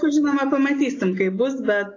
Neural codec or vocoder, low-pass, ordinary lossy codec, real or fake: none; 7.2 kHz; AAC, 32 kbps; real